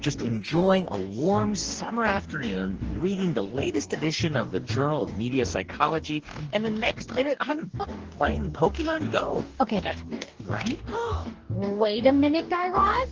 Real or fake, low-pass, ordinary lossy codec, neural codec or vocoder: fake; 7.2 kHz; Opus, 16 kbps; codec, 44.1 kHz, 2.6 kbps, DAC